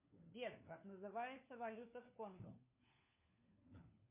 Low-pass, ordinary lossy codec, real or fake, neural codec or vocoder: 3.6 kHz; MP3, 24 kbps; fake; codec, 16 kHz, 4 kbps, FunCodec, trained on LibriTTS, 50 frames a second